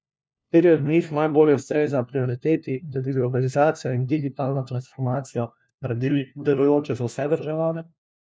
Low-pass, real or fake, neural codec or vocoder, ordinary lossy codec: none; fake; codec, 16 kHz, 1 kbps, FunCodec, trained on LibriTTS, 50 frames a second; none